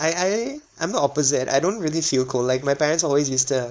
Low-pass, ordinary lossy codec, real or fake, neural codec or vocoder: none; none; fake; codec, 16 kHz, 4.8 kbps, FACodec